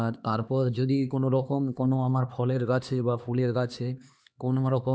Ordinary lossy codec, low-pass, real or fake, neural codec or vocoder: none; none; fake; codec, 16 kHz, 2 kbps, X-Codec, HuBERT features, trained on LibriSpeech